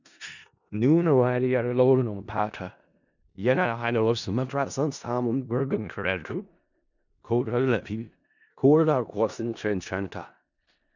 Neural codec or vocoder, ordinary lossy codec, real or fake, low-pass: codec, 16 kHz in and 24 kHz out, 0.4 kbps, LongCat-Audio-Codec, four codebook decoder; none; fake; 7.2 kHz